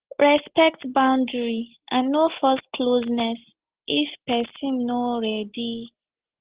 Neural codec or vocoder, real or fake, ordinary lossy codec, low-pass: none; real; Opus, 16 kbps; 3.6 kHz